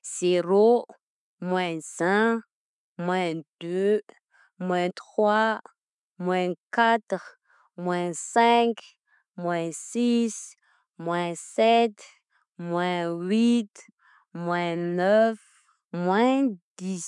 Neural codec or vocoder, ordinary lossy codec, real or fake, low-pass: none; MP3, 96 kbps; real; 10.8 kHz